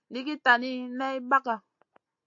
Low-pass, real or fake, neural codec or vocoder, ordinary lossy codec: 7.2 kHz; real; none; AAC, 48 kbps